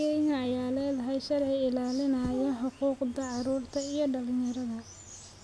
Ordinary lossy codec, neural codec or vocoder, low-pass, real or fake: none; none; none; real